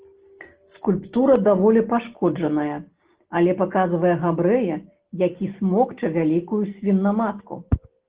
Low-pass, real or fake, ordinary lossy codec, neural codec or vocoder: 3.6 kHz; real; Opus, 16 kbps; none